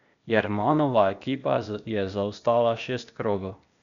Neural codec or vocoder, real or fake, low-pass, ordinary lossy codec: codec, 16 kHz, 0.8 kbps, ZipCodec; fake; 7.2 kHz; none